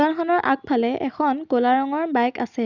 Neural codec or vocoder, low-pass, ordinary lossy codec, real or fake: none; 7.2 kHz; none; real